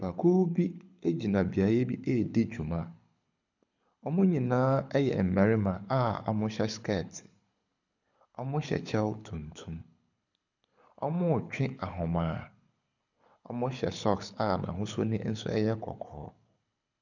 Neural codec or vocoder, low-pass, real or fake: codec, 24 kHz, 6 kbps, HILCodec; 7.2 kHz; fake